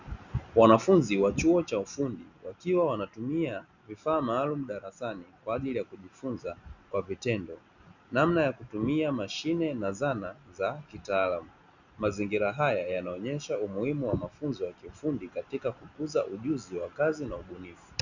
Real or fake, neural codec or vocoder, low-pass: real; none; 7.2 kHz